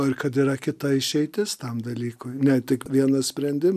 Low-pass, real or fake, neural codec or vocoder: 14.4 kHz; real; none